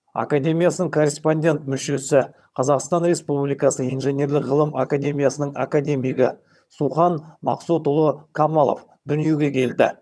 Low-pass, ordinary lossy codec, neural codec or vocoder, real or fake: none; none; vocoder, 22.05 kHz, 80 mel bands, HiFi-GAN; fake